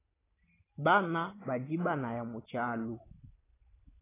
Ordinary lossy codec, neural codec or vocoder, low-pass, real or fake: AAC, 16 kbps; none; 3.6 kHz; real